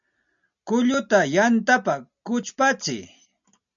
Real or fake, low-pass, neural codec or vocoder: real; 7.2 kHz; none